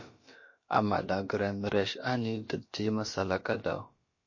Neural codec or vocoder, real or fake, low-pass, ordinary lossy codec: codec, 16 kHz, about 1 kbps, DyCAST, with the encoder's durations; fake; 7.2 kHz; MP3, 32 kbps